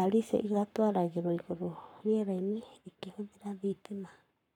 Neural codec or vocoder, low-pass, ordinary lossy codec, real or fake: codec, 44.1 kHz, 7.8 kbps, Pupu-Codec; 19.8 kHz; none; fake